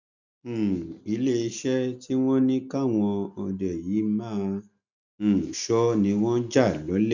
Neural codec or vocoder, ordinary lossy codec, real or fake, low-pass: none; none; real; 7.2 kHz